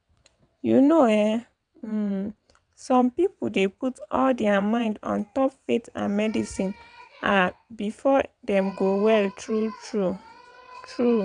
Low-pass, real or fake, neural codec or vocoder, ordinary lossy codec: 9.9 kHz; fake; vocoder, 22.05 kHz, 80 mel bands, WaveNeXt; none